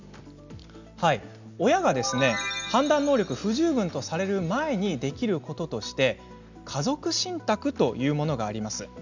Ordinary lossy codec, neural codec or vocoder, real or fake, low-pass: none; none; real; 7.2 kHz